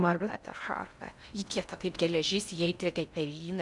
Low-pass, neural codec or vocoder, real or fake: 10.8 kHz; codec, 16 kHz in and 24 kHz out, 0.6 kbps, FocalCodec, streaming, 2048 codes; fake